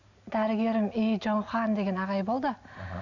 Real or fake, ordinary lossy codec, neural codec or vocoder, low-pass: real; none; none; 7.2 kHz